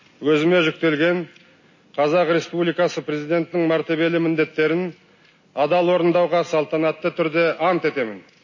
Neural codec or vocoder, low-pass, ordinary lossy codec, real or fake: none; 7.2 kHz; MP3, 32 kbps; real